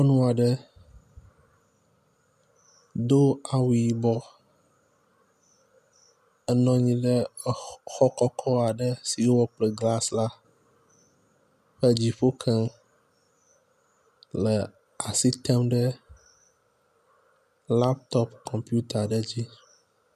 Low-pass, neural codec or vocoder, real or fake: 14.4 kHz; none; real